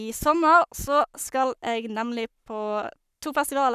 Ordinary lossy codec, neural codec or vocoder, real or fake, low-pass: none; codec, 44.1 kHz, 7.8 kbps, Pupu-Codec; fake; 14.4 kHz